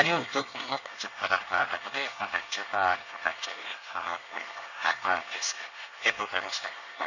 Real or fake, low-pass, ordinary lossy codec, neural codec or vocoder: fake; 7.2 kHz; none; codec, 24 kHz, 1 kbps, SNAC